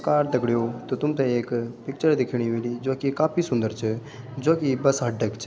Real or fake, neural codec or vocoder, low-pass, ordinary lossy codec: real; none; none; none